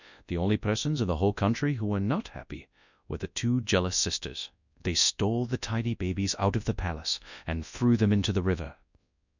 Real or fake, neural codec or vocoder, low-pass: fake; codec, 24 kHz, 0.9 kbps, WavTokenizer, large speech release; 7.2 kHz